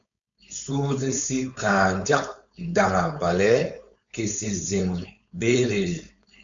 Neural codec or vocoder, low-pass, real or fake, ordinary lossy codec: codec, 16 kHz, 4.8 kbps, FACodec; 7.2 kHz; fake; MP3, 64 kbps